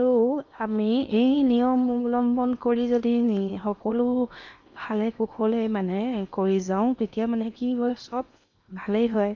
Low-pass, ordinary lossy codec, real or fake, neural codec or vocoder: 7.2 kHz; none; fake; codec, 16 kHz in and 24 kHz out, 0.8 kbps, FocalCodec, streaming, 65536 codes